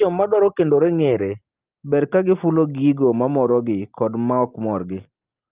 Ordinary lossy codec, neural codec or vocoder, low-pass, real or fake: Opus, 32 kbps; none; 3.6 kHz; real